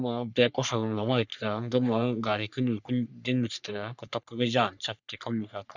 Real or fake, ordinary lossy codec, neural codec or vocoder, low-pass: fake; none; codec, 44.1 kHz, 3.4 kbps, Pupu-Codec; 7.2 kHz